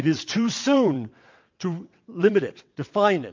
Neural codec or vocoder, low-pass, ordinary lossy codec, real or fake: vocoder, 22.05 kHz, 80 mel bands, WaveNeXt; 7.2 kHz; MP3, 48 kbps; fake